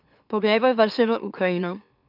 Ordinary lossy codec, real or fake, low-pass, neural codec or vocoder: none; fake; 5.4 kHz; autoencoder, 44.1 kHz, a latent of 192 numbers a frame, MeloTTS